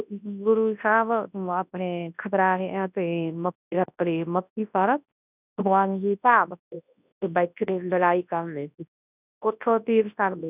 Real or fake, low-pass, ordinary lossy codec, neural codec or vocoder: fake; 3.6 kHz; none; codec, 24 kHz, 0.9 kbps, WavTokenizer, large speech release